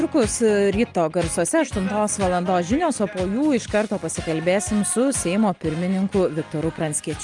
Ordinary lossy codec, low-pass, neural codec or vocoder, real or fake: Opus, 32 kbps; 10.8 kHz; none; real